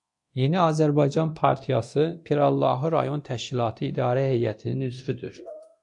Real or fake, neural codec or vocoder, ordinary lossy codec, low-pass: fake; codec, 24 kHz, 0.9 kbps, DualCodec; AAC, 64 kbps; 10.8 kHz